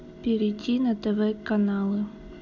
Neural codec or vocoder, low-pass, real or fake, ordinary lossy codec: none; 7.2 kHz; real; none